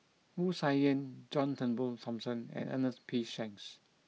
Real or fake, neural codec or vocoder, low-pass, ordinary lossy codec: real; none; none; none